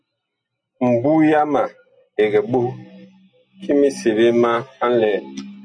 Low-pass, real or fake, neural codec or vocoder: 9.9 kHz; real; none